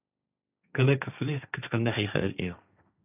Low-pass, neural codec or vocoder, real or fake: 3.6 kHz; codec, 16 kHz, 1.1 kbps, Voila-Tokenizer; fake